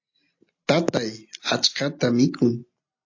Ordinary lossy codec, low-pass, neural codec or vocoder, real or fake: AAC, 48 kbps; 7.2 kHz; none; real